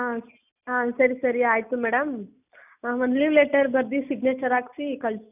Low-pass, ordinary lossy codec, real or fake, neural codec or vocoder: 3.6 kHz; none; real; none